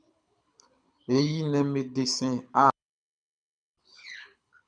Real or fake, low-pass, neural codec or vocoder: fake; 9.9 kHz; codec, 24 kHz, 6 kbps, HILCodec